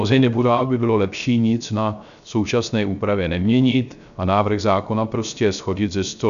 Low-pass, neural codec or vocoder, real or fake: 7.2 kHz; codec, 16 kHz, 0.3 kbps, FocalCodec; fake